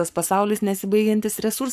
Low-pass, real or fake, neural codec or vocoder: 14.4 kHz; fake; codec, 44.1 kHz, 7.8 kbps, Pupu-Codec